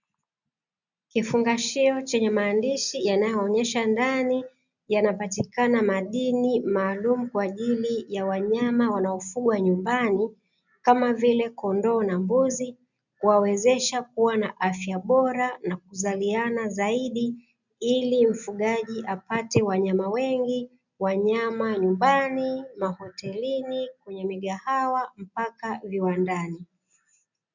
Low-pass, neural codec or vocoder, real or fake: 7.2 kHz; none; real